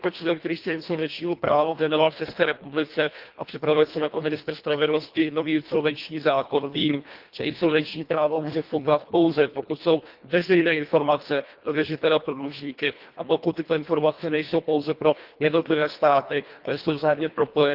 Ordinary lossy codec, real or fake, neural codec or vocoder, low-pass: Opus, 32 kbps; fake; codec, 24 kHz, 1.5 kbps, HILCodec; 5.4 kHz